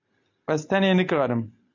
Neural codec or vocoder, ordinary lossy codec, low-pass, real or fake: none; AAC, 48 kbps; 7.2 kHz; real